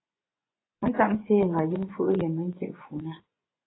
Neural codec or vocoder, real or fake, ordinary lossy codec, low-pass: vocoder, 24 kHz, 100 mel bands, Vocos; fake; AAC, 16 kbps; 7.2 kHz